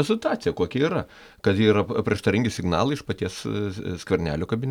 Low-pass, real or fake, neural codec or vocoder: 19.8 kHz; real; none